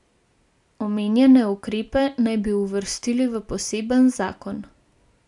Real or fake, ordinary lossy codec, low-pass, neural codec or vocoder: real; none; 10.8 kHz; none